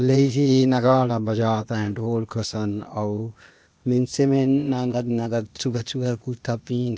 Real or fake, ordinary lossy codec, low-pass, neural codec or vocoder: fake; none; none; codec, 16 kHz, 0.8 kbps, ZipCodec